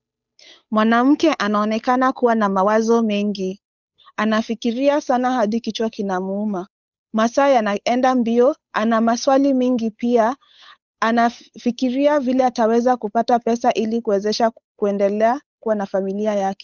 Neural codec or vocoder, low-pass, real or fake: codec, 16 kHz, 8 kbps, FunCodec, trained on Chinese and English, 25 frames a second; 7.2 kHz; fake